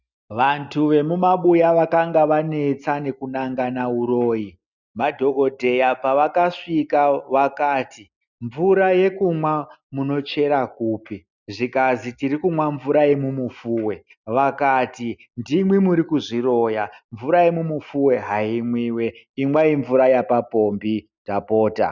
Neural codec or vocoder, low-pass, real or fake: none; 7.2 kHz; real